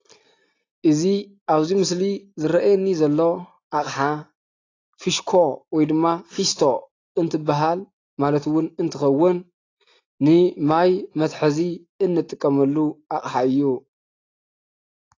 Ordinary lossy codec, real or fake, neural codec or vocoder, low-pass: AAC, 32 kbps; real; none; 7.2 kHz